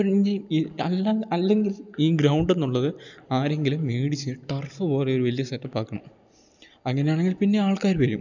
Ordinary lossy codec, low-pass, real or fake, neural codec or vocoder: none; 7.2 kHz; real; none